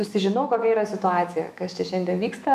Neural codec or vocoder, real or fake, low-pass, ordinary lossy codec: autoencoder, 48 kHz, 128 numbers a frame, DAC-VAE, trained on Japanese speech; fake; 14.4 kHz; MP3, 96 kbps